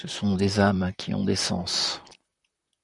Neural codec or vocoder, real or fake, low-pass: vocoder, 44.1 kHz, 128 mel bands, Pupu-Vocoder; fake; 10.8 kHz